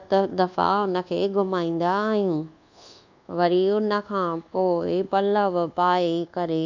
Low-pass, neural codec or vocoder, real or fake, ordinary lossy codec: 7.2 kHz; codec, 24 kHz, 1.2 kbps, DualCodec; fake; none